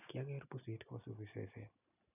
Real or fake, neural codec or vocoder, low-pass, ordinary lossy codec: real; none; 3.6 kHz; none